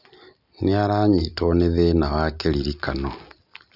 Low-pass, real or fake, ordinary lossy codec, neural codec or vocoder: 5.4 kHz; real; none; none